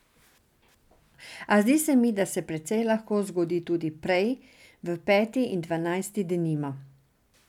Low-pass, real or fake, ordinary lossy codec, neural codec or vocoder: 19.8 kHz; real; none; none